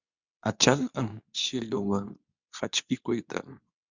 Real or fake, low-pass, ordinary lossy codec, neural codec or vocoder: fake; 7.2 kHz; Opus, 64 kbps; codec, 24 kHz, 0.9 kbps, WavTokenizer, medium speech release version 2